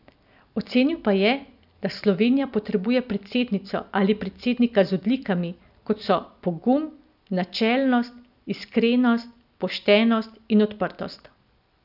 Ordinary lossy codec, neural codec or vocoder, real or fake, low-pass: none; none; real; 5.4 kHz